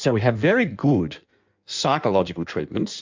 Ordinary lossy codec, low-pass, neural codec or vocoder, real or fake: MP3, 64 kbps; 7.2 kHz; codec, 16 kHz in and 24 kHz out, 1.1 kbps, FireRedTTS-2 codec; fake